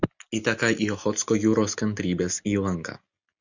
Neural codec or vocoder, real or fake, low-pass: none; real; 7.2 kHz